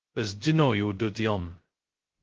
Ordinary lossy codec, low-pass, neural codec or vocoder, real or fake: Opus, 16 kbps; 7.2 kHz; codec, 16 kHz, 0.2 kbps, FocalCodec; fake